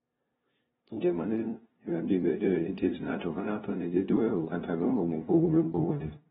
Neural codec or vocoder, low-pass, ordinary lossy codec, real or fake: codec, 16 kHz, 0.5 kbps, FunCodec, trained on LibriTTS, 25 frames a second; 7.2 kHz; AAC, 16 kbps; fake